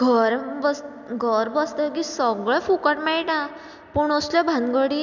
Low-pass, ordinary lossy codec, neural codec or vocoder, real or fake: 7.2 kHz; none; none; real